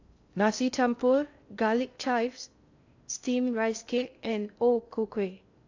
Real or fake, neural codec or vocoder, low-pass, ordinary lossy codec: fake; codec, 16 kHz in and 24 kHz out, 0.6 kbps, FocalCodec, streaming, 2048 codes; 7.2 kHz; AAC, 48 kbps